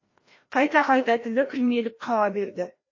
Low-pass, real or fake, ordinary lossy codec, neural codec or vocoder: 7.2 kHz; fake; MP3, 32 kbps; codec, 16 kHz, 1 kbps, FreqCodec, larger model